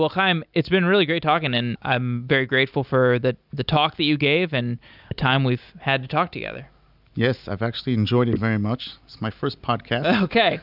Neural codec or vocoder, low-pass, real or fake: none; 5.4 kHz; real